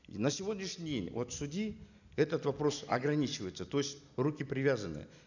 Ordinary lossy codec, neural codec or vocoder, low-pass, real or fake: none; none; 7.2 kHz; real